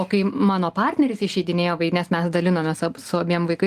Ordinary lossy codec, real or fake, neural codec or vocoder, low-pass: Opus, 24 kbps; real; none; 14.4 kHz